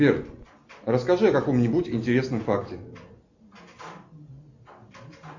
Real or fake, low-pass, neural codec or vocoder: real; 7.2 kHz; none